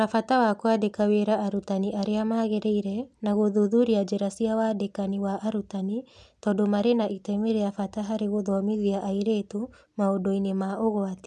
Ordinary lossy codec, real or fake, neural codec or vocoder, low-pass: none; real; none; none